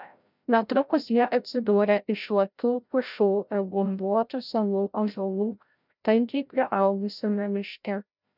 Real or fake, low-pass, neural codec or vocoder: fake; 5.4 kHz; codec, 16 kHz, 0.5 kbps, FreqCodec, larger model